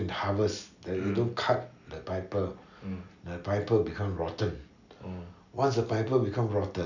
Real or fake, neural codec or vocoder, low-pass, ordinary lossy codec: real; none; 7.2 kHz; none